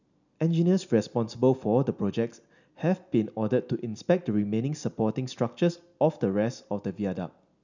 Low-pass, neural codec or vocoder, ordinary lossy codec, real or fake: 7.2 kHz; none; none; real